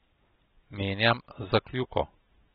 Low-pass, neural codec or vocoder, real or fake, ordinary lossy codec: 7.2 kHz; none; real; AAC, 16 kbps